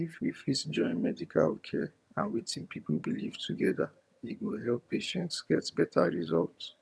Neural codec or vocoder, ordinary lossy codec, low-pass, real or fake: vocoder, 22.05 kHz, 80 mel bands, HiFi-GAN; none; none; fake